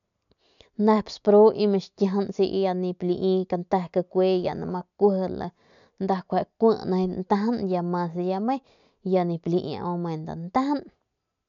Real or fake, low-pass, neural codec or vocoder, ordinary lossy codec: real; 7.2 kHz; none; none